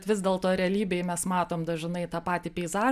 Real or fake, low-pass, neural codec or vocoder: real; 14.4 kHz; none